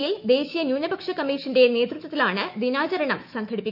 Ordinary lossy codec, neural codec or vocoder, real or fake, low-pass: none; autoencoder, 48 kHz, 128 numbers a frame, DAC-VAE, trained on Japanese speech; fake; 5.4 kHz